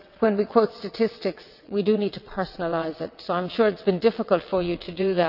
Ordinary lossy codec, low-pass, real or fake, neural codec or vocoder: none; 5.4 kHz; fake; vocoder, 22.05 kHz, 80 mel bands, WaveNeXt